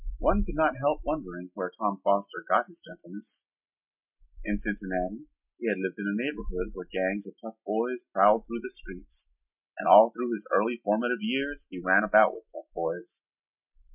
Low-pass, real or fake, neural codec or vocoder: 3.6 kHz; real; none